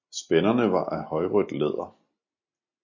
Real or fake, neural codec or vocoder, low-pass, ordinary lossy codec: real; none; 7.2 kHz; MP3, 32 kbps